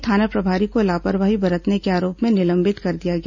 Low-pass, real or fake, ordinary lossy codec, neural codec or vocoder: 7.2 kHz; real; none; none